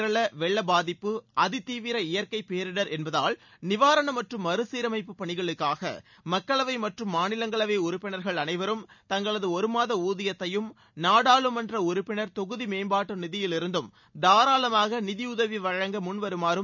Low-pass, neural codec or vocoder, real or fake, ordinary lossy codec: 7.2 kHz; none; real; none